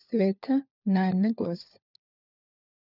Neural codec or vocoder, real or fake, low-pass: codec, 16 kHz, 4 kbps, FunCodec, trained on LibriTTS, 50 frames a second; fake; 5.4 kHz